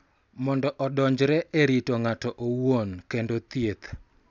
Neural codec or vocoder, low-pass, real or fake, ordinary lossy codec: none; 7.2 kHz; real; none